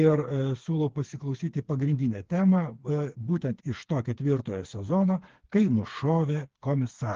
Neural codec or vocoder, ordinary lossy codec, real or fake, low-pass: codec, 16 kHz, 4 kbps, FreqCodec, smaller model; Opus, 16 kbps; fake; 7.2 kHz